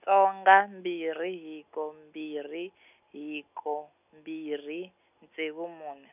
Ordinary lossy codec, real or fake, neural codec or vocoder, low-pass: none; real; none; 3.6 kHz